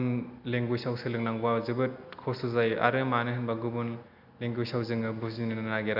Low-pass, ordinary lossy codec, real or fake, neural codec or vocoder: 5.4 kHz; none; real; none